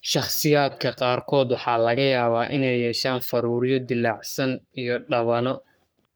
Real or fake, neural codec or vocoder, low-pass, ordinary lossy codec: fake; codec, 44.1 kHz, 3.4 kbps, Pupu-Codec; none; none